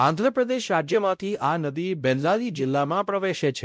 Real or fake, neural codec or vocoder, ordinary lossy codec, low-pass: fake; codec, 16 kHz, 0.5 kbps, X-Codec, WavLM features, trained on Multilingual LibriSpeech; none; none